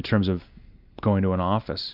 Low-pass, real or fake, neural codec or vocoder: 5.4 kHz; real; none